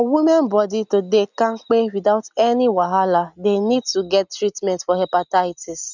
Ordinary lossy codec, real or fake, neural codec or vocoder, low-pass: none; real; none; 7.2 kHz